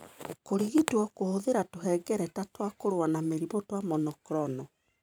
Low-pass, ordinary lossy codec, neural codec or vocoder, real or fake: none; none; vocoder, 44.1 kHz, 128 mel bands every 512 samples, BigVGAN v2; fake